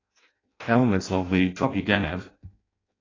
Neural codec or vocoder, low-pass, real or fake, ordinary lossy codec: codec, 16 kHz in and 24 kHz out, 0.6 kbps, FireRedTTS-2 codec; 7.2 kHz; fake; AAC, 32 kbps